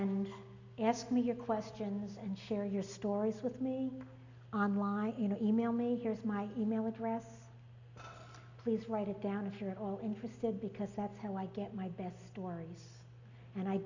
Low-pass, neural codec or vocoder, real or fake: 7.2 kHz; none; real